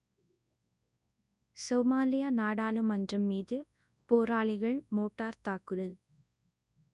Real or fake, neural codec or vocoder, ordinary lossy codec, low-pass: fake; codec, 24 kHz, 0.9 kbps, WavTokenizer, large speech release; none; 10.8 kHz